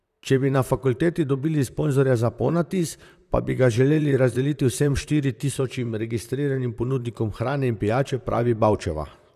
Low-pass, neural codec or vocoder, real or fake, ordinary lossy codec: 14.4 kHz; vocoder, 44.1 kHz, 128 mel bands, Pupu-Vocoder; fake; none